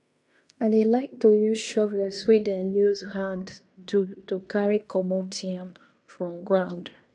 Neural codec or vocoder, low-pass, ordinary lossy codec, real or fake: codec, 16 kHz in and 24 kHz out, 0.9 kbps, LongCat-Audio-Codec, fine tuned four codebook decoder; 10.8 kHz; none; fake